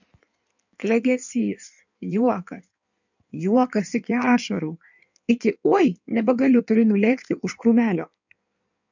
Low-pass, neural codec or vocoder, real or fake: 7.2 kHz; codec, 16 kHz in and 24 kHz out, 1.1 kbps, FireRedTTS-2 codec; fake